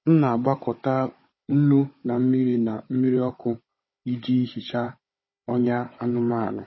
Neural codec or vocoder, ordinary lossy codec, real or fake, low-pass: codec, 16 kHz, 16 kbps, FunCodec, trained on Chinese and English, 50 frames a second; MP3, 24 kbps; fake; 7.2 kHz